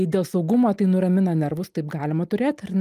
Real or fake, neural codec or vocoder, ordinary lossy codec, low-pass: real; none; Opus, 32 kbps; 14.4 kHz